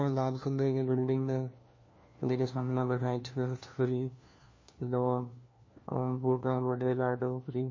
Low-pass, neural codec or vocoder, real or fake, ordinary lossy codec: 7.2 kHz; codec, 16 kHz, 1 kbps, FunCodec, trained on LibriTTS, 50 frames a second; fake; MP3, 32 kbps